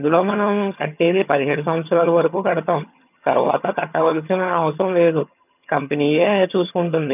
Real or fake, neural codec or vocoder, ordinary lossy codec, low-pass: fake; vocoder, 22.05 kHz, 80 mel bands, HiFi-GAN; none; 3.6 kHz